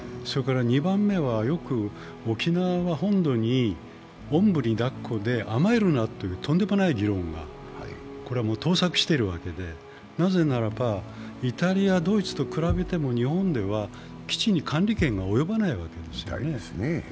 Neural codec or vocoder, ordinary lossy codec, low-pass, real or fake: none; none; none; real